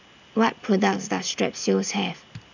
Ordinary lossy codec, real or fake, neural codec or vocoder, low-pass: none; real; none; 7.2 kHz